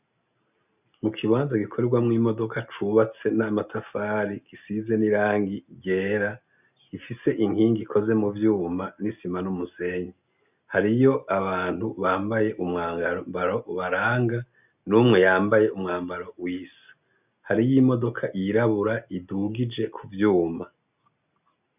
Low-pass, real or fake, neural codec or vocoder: 3.6 kHz; real; none